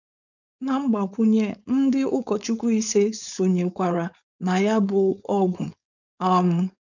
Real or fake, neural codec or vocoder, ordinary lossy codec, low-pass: fake; codec, 16 kHz, 4.8 kbps, FACodec; none; 7.2 kHz